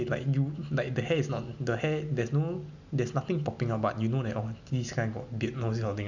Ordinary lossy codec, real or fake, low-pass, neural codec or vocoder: none; real; 7.2 kHz; none